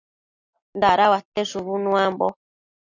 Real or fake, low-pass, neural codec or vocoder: real; 7.2 kHz; none